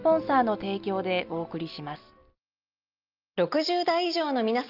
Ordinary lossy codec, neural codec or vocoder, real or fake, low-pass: Opus, 24 kbps; none; real; 5.4 kHz